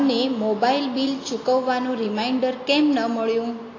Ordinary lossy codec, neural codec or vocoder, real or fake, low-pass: AAC, 32 kbps; none; real; 7.2 kHz